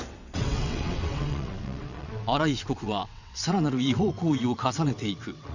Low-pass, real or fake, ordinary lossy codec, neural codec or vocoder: 7.2 kHz; fake; none; vocoder, 22.05 kHz, 80 mel bands, WaveNeXt